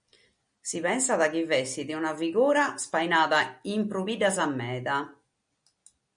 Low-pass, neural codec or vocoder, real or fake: 9.9 kHz; none; real